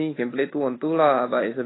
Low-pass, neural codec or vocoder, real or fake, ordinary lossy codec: 7.2 kHz; none; real; AAC, 16 kbps